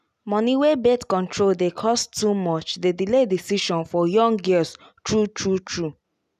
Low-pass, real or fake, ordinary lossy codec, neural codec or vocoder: 14.4 kHz; real; none; none